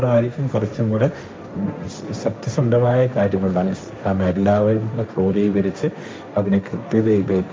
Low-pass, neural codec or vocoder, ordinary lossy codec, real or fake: none; codec, 16 kHz, 1.1 kbps, Voila-Tokenizer; none; fake